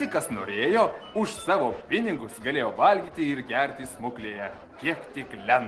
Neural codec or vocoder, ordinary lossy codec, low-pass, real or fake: none; Opus, 16 kbps; 10.8 kHz; real